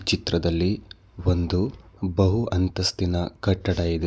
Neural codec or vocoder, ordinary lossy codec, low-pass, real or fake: none; none; none; real